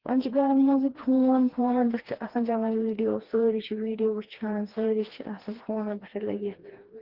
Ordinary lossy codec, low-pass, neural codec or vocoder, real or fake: Opus, 16 kbps; 5.4 kHz; codec, 16 kHz, 2 kbps, FreqCodec, smaller model; fake